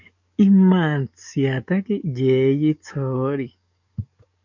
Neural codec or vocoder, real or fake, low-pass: codec, 44.1 kHz, 7.8 kbps, DAC; fake; 7.2 kHz